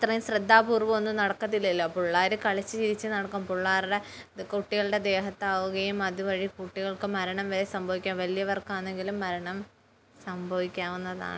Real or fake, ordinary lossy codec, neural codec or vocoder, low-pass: real; none; none; none